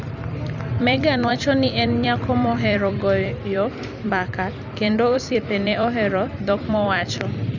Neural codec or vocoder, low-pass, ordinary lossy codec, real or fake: vocoder, 44.1 kHz, 128 mel bands every 256 samples, BigVGAN v2; 7.2 kHz; none; fake